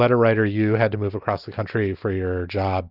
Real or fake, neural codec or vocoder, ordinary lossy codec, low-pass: real; none; Opus, 24 kbps; 5.4 kHz